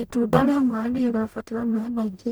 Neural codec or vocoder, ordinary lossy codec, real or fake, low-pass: codec, 44.1 kHz, 0.9 kbps, DAC; none; fake; none